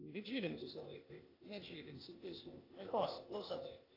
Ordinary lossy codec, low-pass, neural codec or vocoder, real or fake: AAC, 24 kbps; 5.4 kHz; codec, 16 kHz, 0.8 kbps, ZipCodec; fake